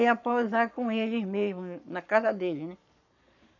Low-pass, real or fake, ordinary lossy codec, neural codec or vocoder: 7.2 kHz; fake; none; codec, 44.1 kHz, 7.8 kbps, Pupu-Codec